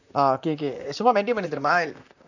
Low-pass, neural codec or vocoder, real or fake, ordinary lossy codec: 7.2 kHz; vocoder, 44.1 kHz, 128 mel bands, Pupu-Vocoder; fake; none